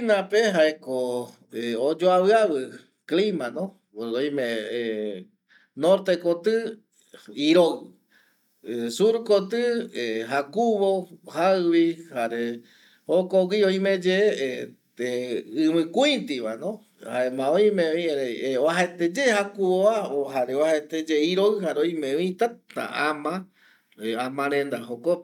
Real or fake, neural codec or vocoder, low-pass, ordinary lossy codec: real; none; 19.8 kHz; none